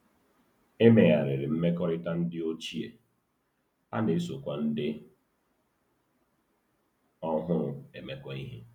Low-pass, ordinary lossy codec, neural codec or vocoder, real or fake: 19.8 kHz; none; none; real